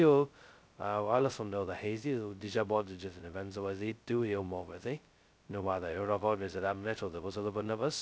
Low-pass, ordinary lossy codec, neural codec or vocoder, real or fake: none; none; codec, 16 kHz, 0.2 kbps, FocalCodec; fake